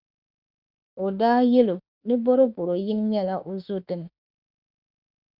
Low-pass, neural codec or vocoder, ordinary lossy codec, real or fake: 5.4 kHz; autoencoder, 48 kHz, 32 numbers a frame, DAC-VAE, trained on Japanese speech; Opus, 64 kbps; fake